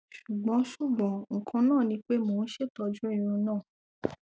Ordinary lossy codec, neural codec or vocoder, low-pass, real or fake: none; none; none; real